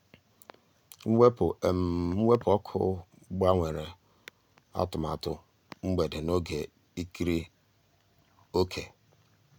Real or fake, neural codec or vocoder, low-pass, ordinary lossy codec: real; none; none; none